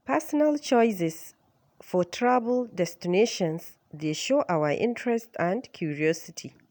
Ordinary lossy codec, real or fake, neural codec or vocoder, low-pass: none; real; none; none